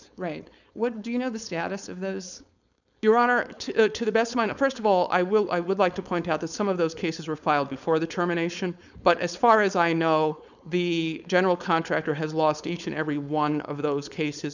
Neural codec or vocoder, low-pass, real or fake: codec, 16 kHz, 4.8 kbps, FACodec; 7.2 kHz; fake